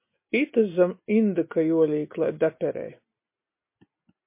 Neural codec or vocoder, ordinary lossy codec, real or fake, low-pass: none; MP3, 24 kbps; real; 3.6 kHz